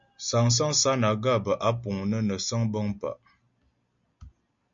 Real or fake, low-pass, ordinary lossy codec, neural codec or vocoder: real; 7.2 kHz; MP3, 48 kbps; none